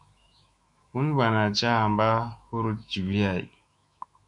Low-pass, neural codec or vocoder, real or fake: 10.8 kHz; autoencoder, 48 kHz, 128 numbers a frame, DAC-VAE, trained on Japanese speech; fake